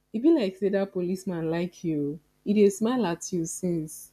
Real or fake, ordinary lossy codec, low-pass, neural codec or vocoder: real; none; 14.4 kHz; none